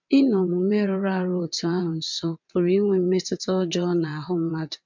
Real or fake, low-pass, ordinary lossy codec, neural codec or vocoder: fake; 7.2 kHz; MP3, 64 kbps; vocoder, 22.05 kHz, 80 mel bands, WaveNeXt